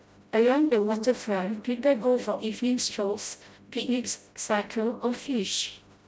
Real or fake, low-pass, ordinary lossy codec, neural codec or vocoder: fake; none; none; codec, 16 kHz, 0.5 kbps, FreqCodec, smaller model